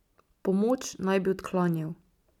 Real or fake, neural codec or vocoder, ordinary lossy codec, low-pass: real; none; none; 19.8 kHz